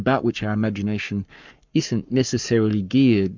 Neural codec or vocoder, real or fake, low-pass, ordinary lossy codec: codec, 44.1 kHz, 7.8 kbps, Pupu-Codec; fake; 7.2 kHz; MP3, 64 kbps